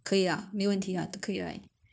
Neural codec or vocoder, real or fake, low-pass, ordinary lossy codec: codec, 16 kHz, 0.9 kbps, LongCat-Audio-Codec; fake; none; none